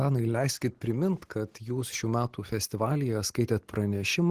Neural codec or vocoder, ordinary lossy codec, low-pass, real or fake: none; Opus, 16 kbps; 14.4 kHz; real